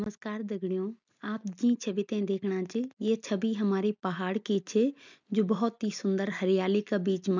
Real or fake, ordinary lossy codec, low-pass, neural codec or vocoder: real; none; 7.2 kHz; none